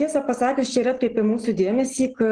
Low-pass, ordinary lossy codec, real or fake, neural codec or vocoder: 9.9 kHz; Opus, 16 kbps; real; none